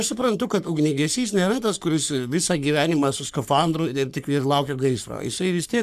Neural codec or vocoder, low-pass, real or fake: codec, 44.1 kHz, 3.4 kbps, Pupu-Codec; 14.4 kHz; fake